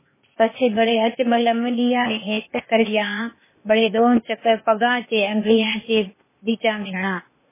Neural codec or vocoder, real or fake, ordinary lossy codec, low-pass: codec, 16 kHz, 0.8 kbps, ZipCodec; fake; MP3, 16 kbps; 3.6 kHz